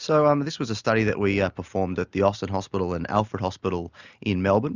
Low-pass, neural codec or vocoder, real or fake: 7.2 kHz; none; real